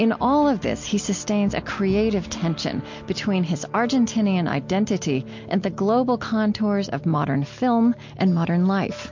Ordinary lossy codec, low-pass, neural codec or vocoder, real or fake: MP3, 48 kbps; 7.2 kHz; none; real